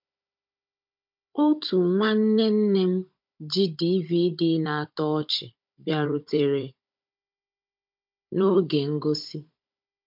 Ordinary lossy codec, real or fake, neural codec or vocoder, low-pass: MP3, 48 kbps; fake; codec, 16 kHz, 16 kbps, FunCodec, trained on Chinese and English, 50 frames a second; 5.4 kHz